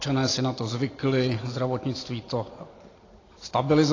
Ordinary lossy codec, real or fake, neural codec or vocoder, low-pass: AAC, 32 kbps; real; none; 7.2 kHz